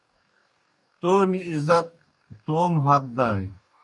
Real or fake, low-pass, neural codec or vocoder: fake; 10.8 kHz; codec, 44.1 kHz, 2.6 kbps, DAC